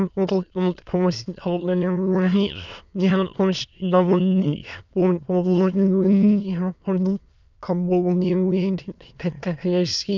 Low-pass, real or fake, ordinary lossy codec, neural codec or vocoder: 7.2 kHz; fake; none; autoencoder, 22.05 kHz, a latent of 192 numbers a frame, VITS, trained on many speakers